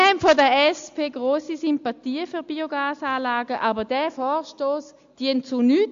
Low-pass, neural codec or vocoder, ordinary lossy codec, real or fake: 7.2 kHz; none; AAC, 64 kbps; real